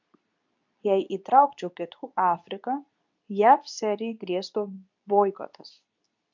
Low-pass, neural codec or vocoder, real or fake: 7.2 kHz; codec, 24 kHz, 0.9 kbps, WavTokenizer, medium speech release version 2; fake